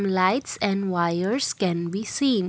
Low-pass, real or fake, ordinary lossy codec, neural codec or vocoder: none; real; none; none